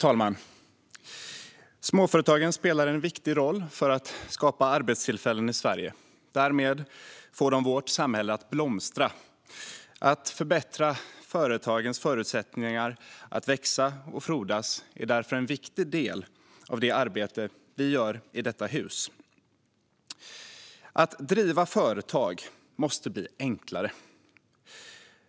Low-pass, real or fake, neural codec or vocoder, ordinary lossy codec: none; real; none; none